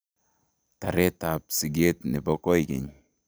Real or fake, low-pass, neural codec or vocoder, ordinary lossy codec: real; none; none; none